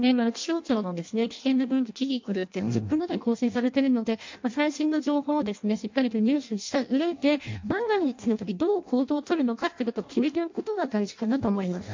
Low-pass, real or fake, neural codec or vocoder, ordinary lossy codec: 7.2 kHz; fake; codec, 16 kHz in and 24 kHz out, 0.6 kbps, FireRedTTS-2 codec; MP3, 48 kbps